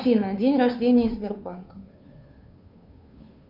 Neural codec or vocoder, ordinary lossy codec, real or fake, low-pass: codec, 16 kHz, 2 kbps, FunCodec, trained on Chinese and English, 25 frames a second; AAC, 48 kbps; fake; 5.4 kHz